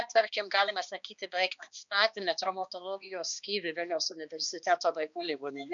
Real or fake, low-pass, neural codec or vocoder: fake; 7.2 kHz; codec, 16 kHz, 2 kbps, X-Codec, HuBERT features, trained on balanced general audio